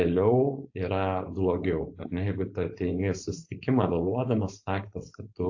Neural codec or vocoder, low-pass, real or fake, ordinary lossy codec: codec, 16 kHz, 4.8 kbps, FACodec; 7.2 kHz; fake; AAC, 48 kbps